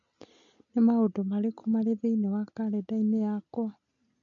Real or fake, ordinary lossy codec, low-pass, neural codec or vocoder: real; none; 7.2 kHz; none